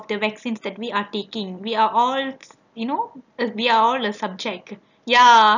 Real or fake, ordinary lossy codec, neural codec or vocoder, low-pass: real; none; none; 7.2 kHz